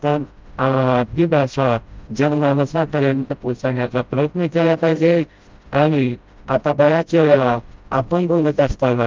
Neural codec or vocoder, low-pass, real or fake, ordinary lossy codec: codec, 16 kHz, 0.5 kbps, FreqCodec, smaller model; 7.2 kHz; fake; Opus, 24 kbps